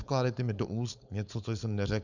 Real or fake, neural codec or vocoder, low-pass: fake; codec, 16 kHz, 4.8 kbps, FACodec; 7.2 kHz